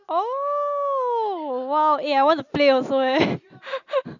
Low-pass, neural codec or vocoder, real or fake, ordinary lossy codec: 7.2 kHz; none; real; none